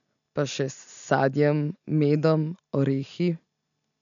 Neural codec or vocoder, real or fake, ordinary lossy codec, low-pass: none; real; none; 7.2 kHz